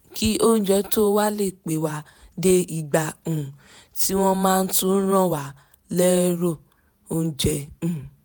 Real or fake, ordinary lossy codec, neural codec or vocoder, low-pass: fake; none; vocoder, 48 kHz, 128 mel bands, Vocos; none